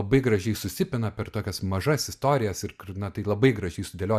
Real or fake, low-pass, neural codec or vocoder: real; 14.4 kHz; none